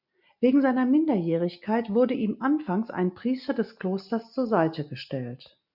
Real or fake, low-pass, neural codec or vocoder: real; 5.4 kHz; none